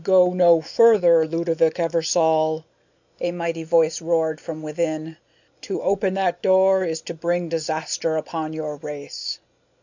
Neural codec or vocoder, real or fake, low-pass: none; real; 7.2 kHz